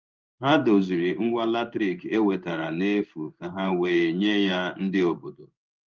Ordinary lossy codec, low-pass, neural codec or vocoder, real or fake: Opus, 24 kbps; 7.2 kHz; codec, 16 kHz in and 24 kHz out, 1 kbps, XY-Tokenizer; fake